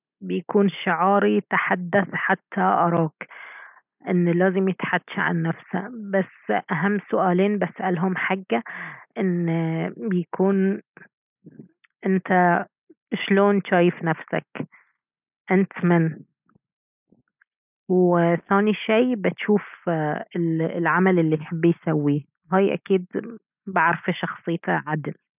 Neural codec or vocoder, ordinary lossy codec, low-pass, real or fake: none; none; 3.6 kHz; real